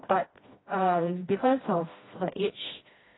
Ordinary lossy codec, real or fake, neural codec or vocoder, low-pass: AAC, 16 kbps; fake; codec, 16 kHz, 1 kbps, FreqCodec, smaller model; 7.2 kHz